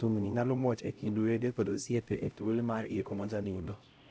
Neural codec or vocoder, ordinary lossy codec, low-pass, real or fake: codec, 16 kHz, 0.5 kbps, X-Codec, HuBERT features, trained on LibriSpeech; none; none; fake